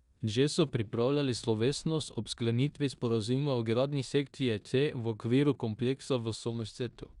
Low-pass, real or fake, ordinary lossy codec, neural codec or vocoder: 10.8 kHz; fake; Opus, 64 kbps; codec, 16 kHz in and 24 kHz out, 0.9 kbps, LongCat-Audio-Codec, four codebook decoder